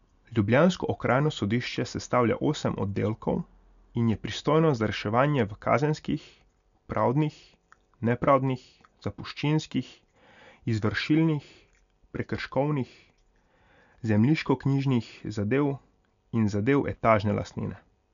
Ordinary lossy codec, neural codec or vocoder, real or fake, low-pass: none; none; real; 7.2 kHz